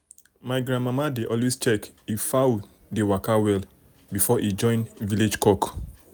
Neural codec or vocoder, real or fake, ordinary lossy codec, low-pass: none; real; none; none